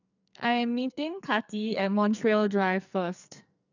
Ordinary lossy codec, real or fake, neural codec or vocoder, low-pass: none; fake; codec, 44.1 kHz, 2.6 kbps, SNAC; 7.2 kHz